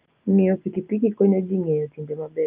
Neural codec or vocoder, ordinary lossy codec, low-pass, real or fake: none; Opus, 24 kbps; 3.6 kHz; real